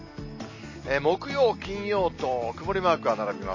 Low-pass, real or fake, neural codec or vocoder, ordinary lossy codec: 7.2 kHz; real; none; MP3, 32 kbps